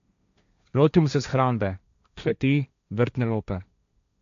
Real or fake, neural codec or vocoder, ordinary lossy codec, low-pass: fake; codec, 16 kHz, 1.1 kbps, Voila-Tokenizer; MP3, 96 kbps; 7.2 kHz